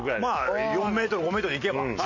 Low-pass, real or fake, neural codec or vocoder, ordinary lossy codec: 7.2 kHz; real; none; none